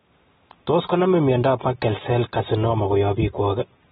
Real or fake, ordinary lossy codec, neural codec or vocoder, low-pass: real; AAC, 16 kbps; none; 19.8 kHz